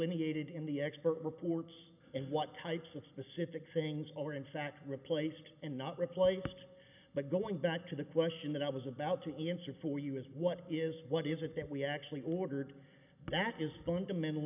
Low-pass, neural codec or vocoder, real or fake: 3.6 kHz; none; real